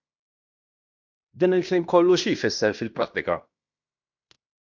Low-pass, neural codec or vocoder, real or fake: 7.2 kHz; codec, 16 kHz in and 24 kHz out, 0.9 kbps, LongCat-Audio-Codec, fine tuned four codebook decoder; fake